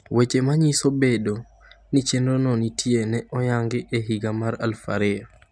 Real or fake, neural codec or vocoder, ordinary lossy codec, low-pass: real; none; none; 9.9 kHz